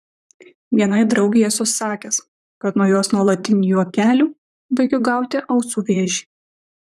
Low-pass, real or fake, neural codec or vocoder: 14.4 kHz; fake; vocoder, 44.1 kHz, 128 mel bands, Pupu-Vocoder